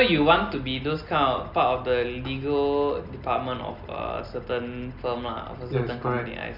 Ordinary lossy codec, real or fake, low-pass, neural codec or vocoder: none; real; 5.4 kHz; none